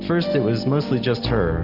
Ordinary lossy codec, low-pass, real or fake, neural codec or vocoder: Opus, 24 kbps; 5.4 kHz; real; none